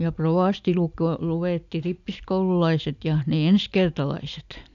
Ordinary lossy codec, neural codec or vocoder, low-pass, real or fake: none; none; 7.2 kHz; real